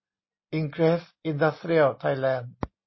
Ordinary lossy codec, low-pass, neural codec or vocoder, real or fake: MP3, 24 kbps; 7.2 kHz; none; real